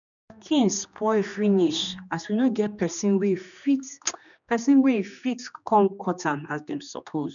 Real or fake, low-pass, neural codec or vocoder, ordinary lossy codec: fake; 7.2 kHz; codec, 16 kHz, 2 kbps, X-Codec, HuBERT features, trained on general audio; none